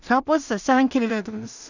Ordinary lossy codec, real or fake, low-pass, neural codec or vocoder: none; fake; 7.2 kHz; codec, 16 kHz in and 24 kHz out, 0.4 kbps, LongCat-Audio-Codec, two codebook decoder